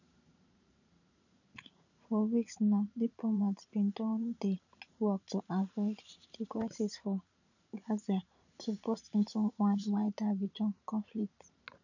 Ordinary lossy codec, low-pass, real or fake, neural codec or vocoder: none; 7.2 kHz; fake; vocoder, 44.1 kHz, 80 mel bands, Vocos